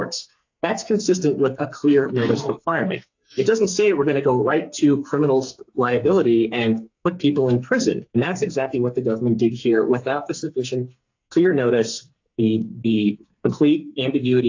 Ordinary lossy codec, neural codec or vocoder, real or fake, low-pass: AAC, 48 kbps; codec, 44.1 kHz, 2.6 kbps, SNAC; fake; 7.2 kHz